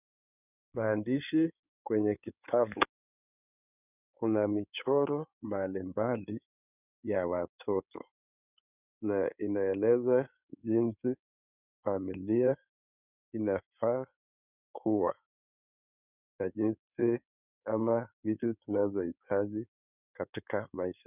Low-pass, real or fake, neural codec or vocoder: 3.6 kHz; fake; codec, 16 kHz in and 24 kHz out, 2.2 kbps, FireRedTTS-2 codec